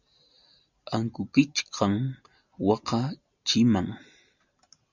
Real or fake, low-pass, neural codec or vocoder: real; 7.2 kHz; none